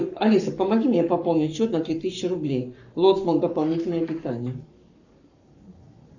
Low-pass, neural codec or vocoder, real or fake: 7.2 kHz; codec, 44.1 kHz, 7.8 kbps, Pupu-Codec; fake